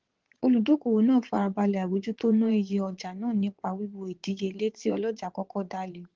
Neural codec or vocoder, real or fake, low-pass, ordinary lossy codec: vocoder, 22.05 kHz, 80 mel bands, WaveNeXt; fake; 7.2 kHz; Opus, 16 kbps